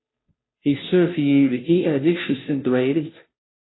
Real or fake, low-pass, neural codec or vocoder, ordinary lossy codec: fake; 7.2 kHz; codec, 16 kHz, 0.5 kbps, FunCodec, trained on Chinese and English, 25 frames a second; AAC, 16 kbps